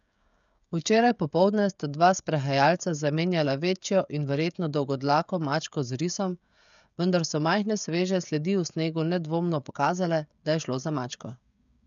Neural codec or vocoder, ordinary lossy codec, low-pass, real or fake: codec, 16 kHz, 16 kbps, FreqCodec, smaller model; none; 7.2 kHz; fake